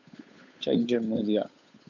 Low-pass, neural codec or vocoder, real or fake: 7.2 kHz; codec, 16 kHz, 8 kbps, FunCodec, trained on Chinese and English, 25 frames a second; fake